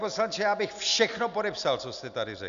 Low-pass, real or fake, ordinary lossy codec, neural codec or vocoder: 7.2 kHz; real; MP3, 96 kbps; none